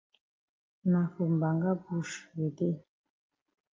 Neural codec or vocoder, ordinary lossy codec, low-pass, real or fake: none; Opus, 24 kbps; 7.2 kHz; real